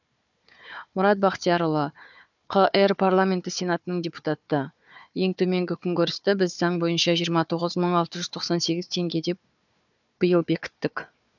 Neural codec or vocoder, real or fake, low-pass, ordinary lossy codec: codec, 16 kHz, 4 kbps, FunCodec, trained on Chinese and English, 50 frames a second; fake; 7.2 kHz; none